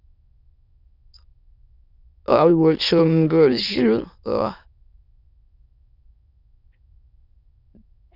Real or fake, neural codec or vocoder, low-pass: fake; autoencoder, 22.05 kHz, a latent of 192 numbers a frame, VITS, trained on many speakers; 5.4 kHz